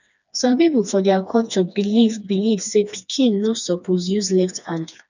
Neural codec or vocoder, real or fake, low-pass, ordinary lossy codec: codec, 16 kHz, 2 kbps, FreqCodec, smaller model; fake; 7.2 kHz; none